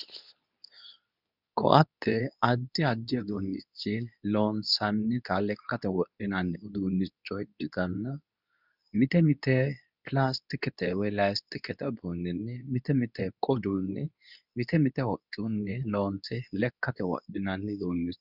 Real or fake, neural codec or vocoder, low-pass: fake; codec, 24 kHz, 0.9 kbps, WavTokenizer, medium speech release version 2; 5.4 kHz